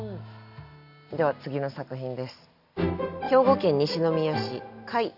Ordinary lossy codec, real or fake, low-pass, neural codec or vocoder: none; real; 5.4 kHz; none